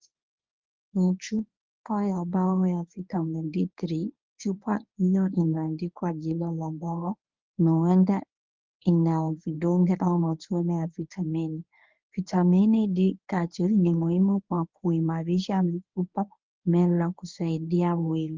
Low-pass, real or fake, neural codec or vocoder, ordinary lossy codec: 7.2 kHz; fake; codec, 24 kHz, 0.9 kbps, WavTokenizer, medium speech release version 1; Opus, 32 kbps